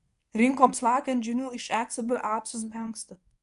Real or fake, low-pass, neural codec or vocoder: fake; 10.8 kHz; codec, 24 kHz, 0.9 kbps, WavTokenizer, medium speech release version 1